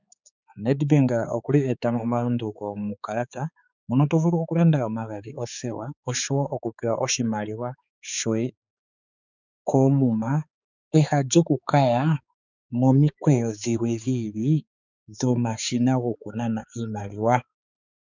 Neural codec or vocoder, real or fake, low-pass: codec, 16 kHz, 4 kbps, X-Codec, HuBERT features, trained on balanced general audio; fake; 7.2 kHz